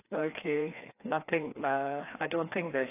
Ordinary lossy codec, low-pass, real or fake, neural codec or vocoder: AAC, 24 kbps; 3.6 kHz; fake; codec, 16 kHz, 2 kbps, FreqCodec, larger model